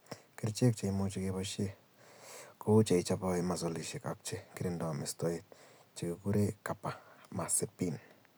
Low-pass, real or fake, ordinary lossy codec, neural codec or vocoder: none; real; none; none